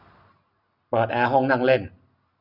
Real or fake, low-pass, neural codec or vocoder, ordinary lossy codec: real; 5.4 kHz; none; none